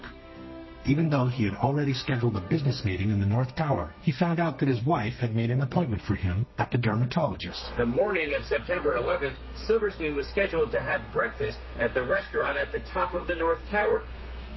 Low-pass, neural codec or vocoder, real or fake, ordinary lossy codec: 7.2 kHz; codec, 32 kHz, 1.9 kbps, SNAC; fake; MP3, 24 kbps